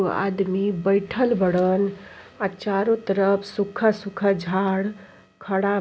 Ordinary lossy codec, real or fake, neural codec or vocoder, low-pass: none; real; none; none